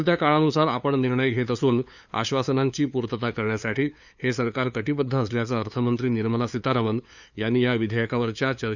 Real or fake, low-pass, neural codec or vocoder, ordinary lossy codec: fake; 7.2 kHz; codec, 16 kHz, 2 kbps, FunCodec, trained on LibriTTS, 25 frames a second; none